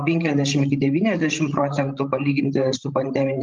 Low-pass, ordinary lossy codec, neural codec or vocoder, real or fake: 7.2 kHz; Opus, 32 kbps; codec, 16 kHz, 16 kbps, FreqCodec, larger model; fake